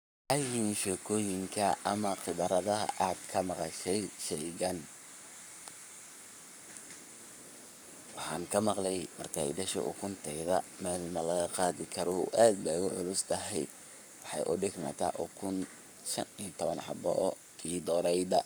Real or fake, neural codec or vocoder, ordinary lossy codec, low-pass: fake; codec, 44.1 kHz, 7.8 kbps, Pupu-Codec; none; none